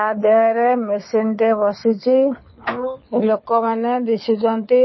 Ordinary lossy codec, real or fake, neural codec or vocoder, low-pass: MP3, 24 kbps; fake; codec, 24 kHz, 6 kbps, HILCodec; 7.2 kHz